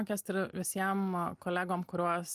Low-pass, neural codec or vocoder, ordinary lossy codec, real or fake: 14.4 kHz; none; Opus, 32 kbps; real